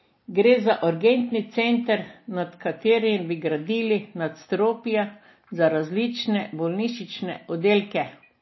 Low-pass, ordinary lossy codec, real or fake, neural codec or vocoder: 7.2 kHz; MP3, 24 kbps; real; none